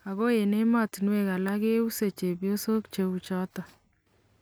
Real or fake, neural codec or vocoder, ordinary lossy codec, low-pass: real; none; none; none